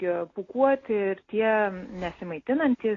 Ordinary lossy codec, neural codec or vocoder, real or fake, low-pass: AAC, 32 kbps; none; real; 7.2 kHz